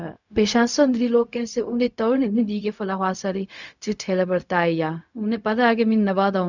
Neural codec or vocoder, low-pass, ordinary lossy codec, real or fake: codec, 16 kHz, 0.4 kbps, LongCat-Audio-Codec; 7.2 kHz; none; fake